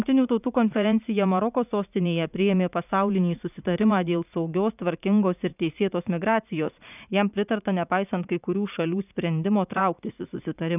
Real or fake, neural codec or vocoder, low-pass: fake; vocoder, 44.1 kHz, 80 mel bands, Vocos; 3.6 kHz